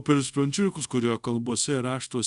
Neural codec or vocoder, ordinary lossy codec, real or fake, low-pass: codec, 24 kHz, 0.5 kbps, DualCodec; Opus, 64 kbps; fake; 10.8 kHz